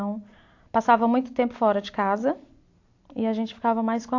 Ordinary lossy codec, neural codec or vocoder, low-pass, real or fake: AAC, 48 kbps; none; 7.2 kHz; real